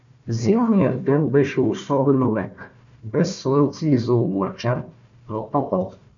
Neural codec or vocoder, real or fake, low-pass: codec, 16 kHz, 1 kbps, FunCodec, trained on Chinese and English, 50 frames a second; fake; 7.2 kHz